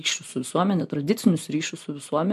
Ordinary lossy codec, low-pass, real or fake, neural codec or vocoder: MP3, 64 kbps; 14.4 kHz; real; none